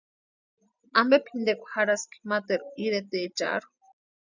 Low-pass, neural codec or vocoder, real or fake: 7.2 kHz; none; real